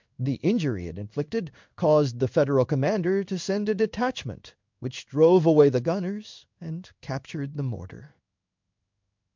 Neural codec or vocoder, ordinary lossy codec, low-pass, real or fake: codec, 16 kHz in and 24 kHz out, 1 kbps, XY-Tokenizer; MP3, 64 kbps; 7.2 kHz; fake